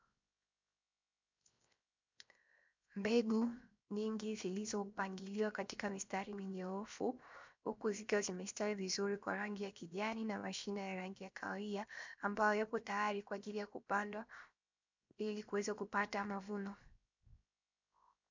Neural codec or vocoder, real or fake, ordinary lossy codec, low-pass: codec, 16 kHz, 0.7 kbps, FocalCodec; fake; MP3, 64 kbps; 7.2 kHz